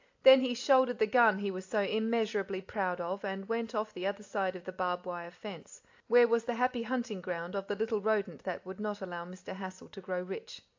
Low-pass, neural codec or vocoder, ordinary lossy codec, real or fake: 7.2 kHz; none; AAC, 48 kbps; real